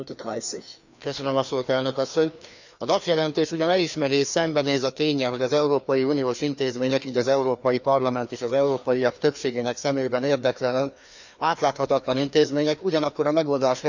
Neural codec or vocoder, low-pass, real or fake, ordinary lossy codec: codec, 16 kHz, 2 kbps, FreqCodec, larger model; 7.2 kHz; fake; none